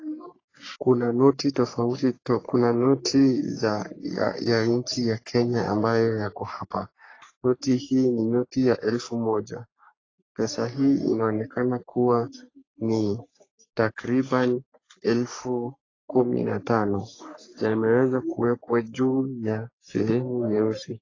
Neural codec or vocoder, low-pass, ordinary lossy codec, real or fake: codec, 44.1 kHz, 3.4 kbps, Pupu-Codec; 7.2 kHz; AAC, 32 kbps; fake